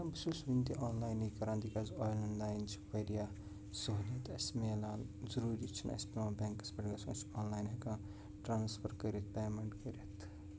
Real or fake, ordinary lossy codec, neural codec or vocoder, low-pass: real; none; none; none